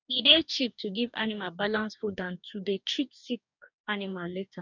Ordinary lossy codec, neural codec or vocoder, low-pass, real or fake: none; codec, 44.1 kHz, 2.6 kbps, DAC; 7.2 kHz; fake